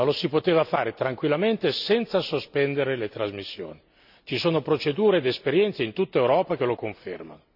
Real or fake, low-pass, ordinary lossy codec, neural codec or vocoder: real; 5.4 kHz; none; none